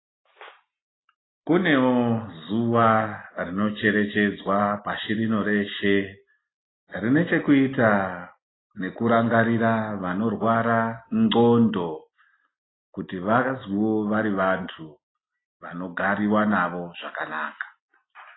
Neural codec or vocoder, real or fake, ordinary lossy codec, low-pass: none; real; AAC, 16 kbps; 7.2 kHz